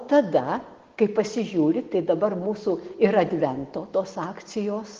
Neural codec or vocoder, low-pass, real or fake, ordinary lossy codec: none; 7.2 kHz; real; Opus, 16 kbps